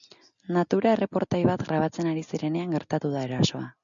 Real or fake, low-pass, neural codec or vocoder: real; 7.2 kHz; none